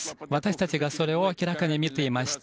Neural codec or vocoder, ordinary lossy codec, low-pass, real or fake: none; none; none; real